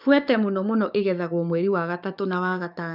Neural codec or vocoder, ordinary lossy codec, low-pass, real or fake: codec, 44.1 kHz, 7.8 kbps, DAC; none; 5.4 kHz; fake